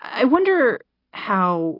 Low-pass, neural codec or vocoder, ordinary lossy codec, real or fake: 5.4 kHz; none; AAC, 24 kbps; real